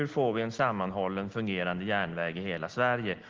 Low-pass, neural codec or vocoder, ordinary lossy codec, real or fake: 7.2 kHz; none; Opus, 16 kbps; real